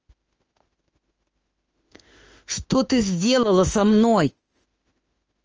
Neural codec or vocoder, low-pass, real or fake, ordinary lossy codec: autoencoder, 48 kHz, 32 numbers a frame, DAC-VAE, trained on Japanese speech; 7.2 kHz; fake; Opus, 24 kbps